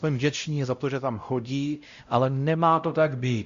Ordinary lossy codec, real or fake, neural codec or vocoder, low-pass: Opus, 64 kbps; fake; codec, 16 kHz, 0.5 kbps, X-Codec, WavLM features, trained on Multilingual LibriSpeech; 7.2 kHz